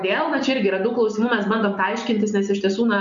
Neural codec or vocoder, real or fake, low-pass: none; real; 7.2 kHz